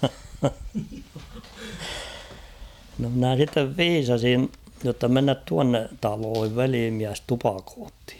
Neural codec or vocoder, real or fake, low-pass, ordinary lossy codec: none; real; 19.8 kHz; none